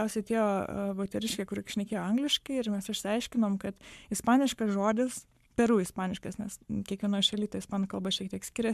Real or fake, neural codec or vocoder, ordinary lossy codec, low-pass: fake; codec, 44.1 kHz, 7.8 kbps, Pupu-Codec; MP3, 96 kbps; 14.4 kHz